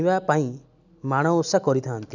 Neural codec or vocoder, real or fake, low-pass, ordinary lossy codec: none; real; 7.2 kHz; none